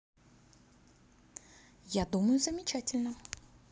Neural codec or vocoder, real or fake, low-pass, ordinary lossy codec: none; real; none; none